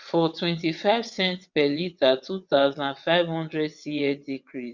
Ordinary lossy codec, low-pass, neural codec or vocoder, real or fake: Opus, 64 kbps; 7.2 kHz; vocoder, 22.05 kHz, 80 mel bands, WaveNeXt; fake